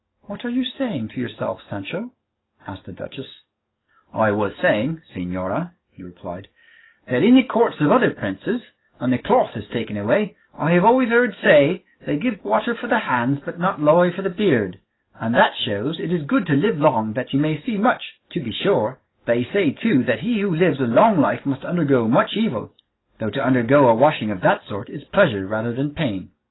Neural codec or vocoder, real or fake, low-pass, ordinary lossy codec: codec, 44.1 kHz, 7.8 kbps, DAC; fake; 7.2 kHz; AAC, 16 kbps